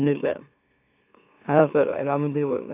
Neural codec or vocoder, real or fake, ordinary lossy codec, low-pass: autoencoder, 44.1 kHz, a latent of 192 numbers a frame, MeloTTS; fake; AAC, 24 kbps; 3.6 kHz